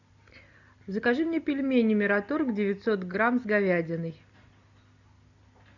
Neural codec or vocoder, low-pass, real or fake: none; 7.2 kHz; real